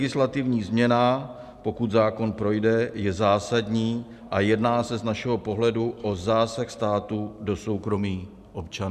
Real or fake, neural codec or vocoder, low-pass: real; none; 14.4 kHz